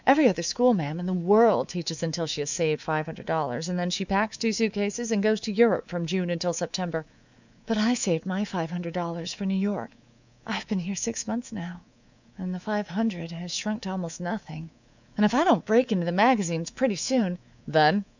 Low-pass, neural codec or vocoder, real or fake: 7.2 kHz; codec, 24 kHz, 3.1 kbps, DualCodec; fake